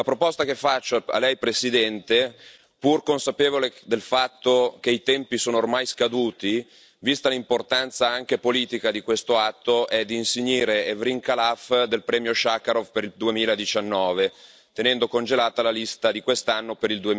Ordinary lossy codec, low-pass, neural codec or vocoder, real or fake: none; none; none; real